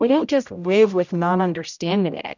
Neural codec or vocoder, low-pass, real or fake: codec, 16 kHz, 0.5 kbps, X-Codec, HuBERT features, trained on general audio; 7.2 kHz; fake